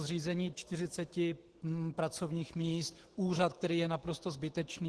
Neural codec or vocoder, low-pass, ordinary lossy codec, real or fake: autoencoder, 48 kHz, 128 numbers a frame, DAC-VAE, trained on Japanese speech; 10.8 kHz; Opus, 16 kbps; fake